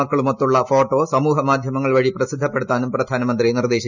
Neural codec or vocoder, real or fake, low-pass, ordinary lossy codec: none; real; 7.2 kHz; none